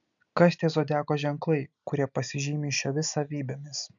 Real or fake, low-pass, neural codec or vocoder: real; 7.2 kHz; none